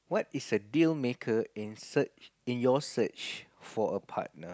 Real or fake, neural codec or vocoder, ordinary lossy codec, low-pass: real; none; none; none